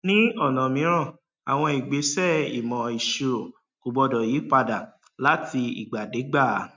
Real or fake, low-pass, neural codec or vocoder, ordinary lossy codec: real; 7.2 kHz; none; MP3, 64 kbps